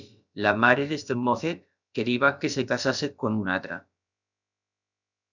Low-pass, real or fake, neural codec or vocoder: 7.2 kHz; fake; codec, 16 kHz, about 1 kbps, DyCAST, with the encoder's durations